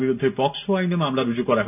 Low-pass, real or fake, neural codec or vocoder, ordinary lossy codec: 3.6 kHz; real; none; none